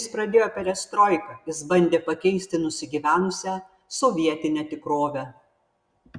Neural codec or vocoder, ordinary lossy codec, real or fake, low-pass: none; Opus, 64 kbps; real; 9.9 kHz